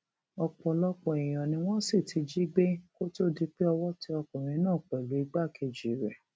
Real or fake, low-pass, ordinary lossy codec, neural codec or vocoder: real; none; none; none